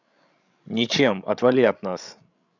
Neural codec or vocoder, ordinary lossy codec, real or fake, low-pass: codec, 16 kHz, 16 kbps, FreqCodec, larger model; none; fake; 7.2 kHz